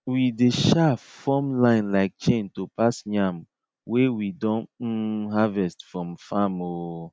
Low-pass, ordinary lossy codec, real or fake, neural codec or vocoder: none; none; real; none